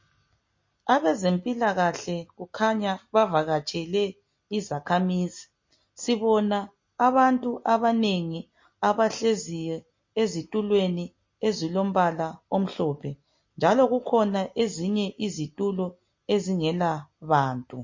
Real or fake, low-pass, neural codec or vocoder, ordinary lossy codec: real; 7.2 kHz; none; MP3, 32 kbps